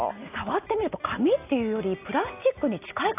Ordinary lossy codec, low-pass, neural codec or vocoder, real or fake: AAC, 24 kbps; 3.6 kHz; none; real